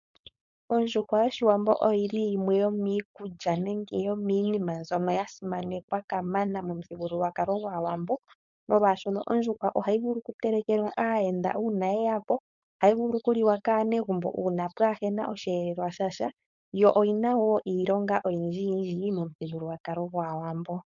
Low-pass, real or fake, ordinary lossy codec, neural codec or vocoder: 7.2 kHz; fake; MP3, 64 kbps; codec, 16 kHz, 4.8 kbps, FACodec